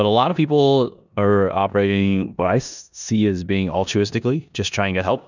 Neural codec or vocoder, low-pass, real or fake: codec, 16 kHz in and 24 kHz out, 0.9 kbps, LongCat-Audio-Codec, four codebook decoder; 7.2 kHz; fake